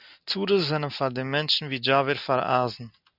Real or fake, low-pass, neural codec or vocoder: real; 5.4 kHz; none